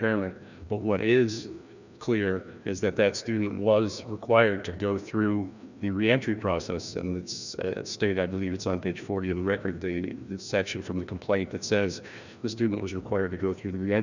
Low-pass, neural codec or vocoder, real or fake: 7.2 kHz; codec, 16 kHz, 1 kbps, FreqCodec, larger model; fake